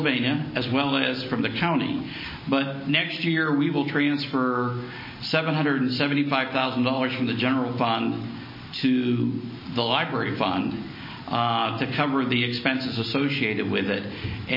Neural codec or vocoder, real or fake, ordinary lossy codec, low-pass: none; real; MP3, 24 kbps; 5.4 kHz